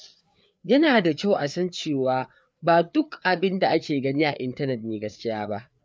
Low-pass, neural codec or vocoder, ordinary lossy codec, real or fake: none; codec, 16 kHz, 4 kbps, FreqCodec, larger model; none; fake